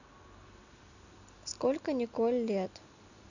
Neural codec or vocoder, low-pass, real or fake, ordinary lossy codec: none; 7.2 kHz; real; none